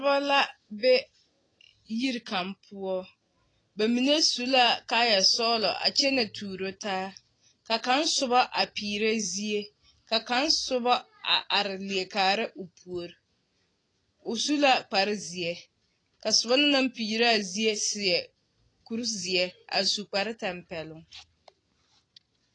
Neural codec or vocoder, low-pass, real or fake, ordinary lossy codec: none; 9.9 kHz; real; AAC, 32 kbps